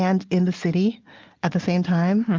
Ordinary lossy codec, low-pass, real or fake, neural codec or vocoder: Opus, 32 kbps; 7.2 kHz; real; none